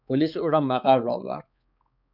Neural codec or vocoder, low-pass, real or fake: codec, 16 kHz, 4 kbps, X-Codec, HuBERT features, trained on balanced general audio; 5.4 kHz; fake